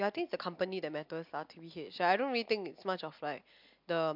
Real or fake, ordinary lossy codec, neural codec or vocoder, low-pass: real; MP3, 48 kbps; none; 5.4 kHz